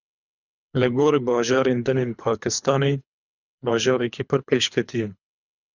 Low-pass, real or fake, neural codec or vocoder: 7.2 kHz; fake; codec, 24 kHz, 3 kbps, HILCodec